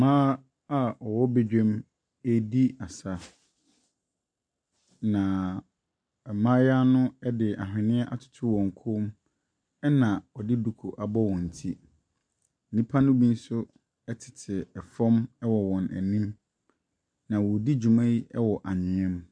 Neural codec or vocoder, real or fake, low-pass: none; real; 9.9 kHz